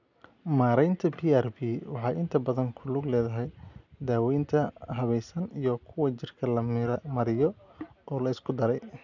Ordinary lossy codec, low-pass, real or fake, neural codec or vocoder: none; 7.2 kHz; real; none